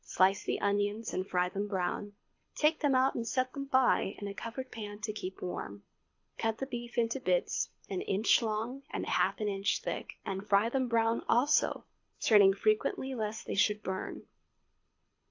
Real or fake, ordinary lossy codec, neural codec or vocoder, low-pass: fake; AAC, 48 kbps; codec, 24 kHz, 6 kbps, HILCodec; 7.2 kHz